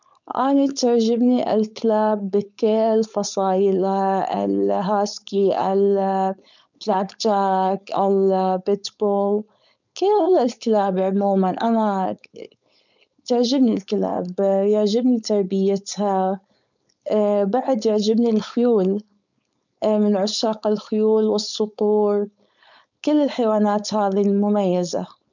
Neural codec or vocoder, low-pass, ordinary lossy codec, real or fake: codec, 16 kHz, 4.8 kbps, FACodec; 7.2 kHz; none; fake